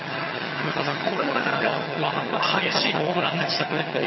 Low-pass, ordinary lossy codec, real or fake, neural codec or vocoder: 7.2 kHz; MP3, 24 kbps; fake; vocoder, 22.05 kHz, 80 mel bands, HiFi-GAN